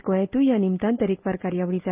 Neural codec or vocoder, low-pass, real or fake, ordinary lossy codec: none; 3.6 kHz; real; Opus, 32 kbps